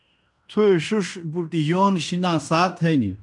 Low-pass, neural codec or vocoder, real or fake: 10.8 kHz; codec, 16 kHz in and 24 kHz out, 0.9 kbps, LongCat-Audio-Codec, fine tuned four codebook decoder; fake